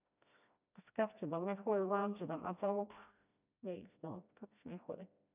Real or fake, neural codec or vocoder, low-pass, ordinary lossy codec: fake; codec, 16 kHz, 1 kbps, FreqCodec, smaller model; 3.6 kHz; none